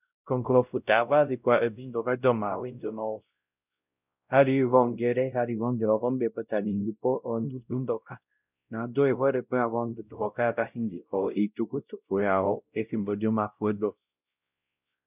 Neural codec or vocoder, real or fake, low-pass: codec, 16 kHz, 0.5 kbps, X-Codec, WavLM features, trained on Multilingual LibriSpeech; fake; 3.6 kHz